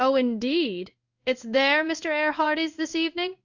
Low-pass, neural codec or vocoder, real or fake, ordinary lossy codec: 7.2 kHz; codec, 16 kHz in and 24 kHz out, 1 kbps, XY-Tokenizer; fake; Opus, 64 kbps